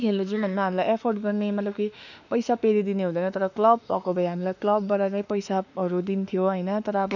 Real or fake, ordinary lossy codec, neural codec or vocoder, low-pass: fake; none; autoencoder, 48 kHz, 32 numbers a frame, DAC-VAE, trained on Japanese speech; 7.2 kHz